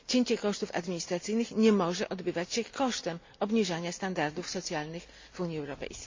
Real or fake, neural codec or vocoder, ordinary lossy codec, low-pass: real; none; MP3, 48 kbps; 7.2 kHz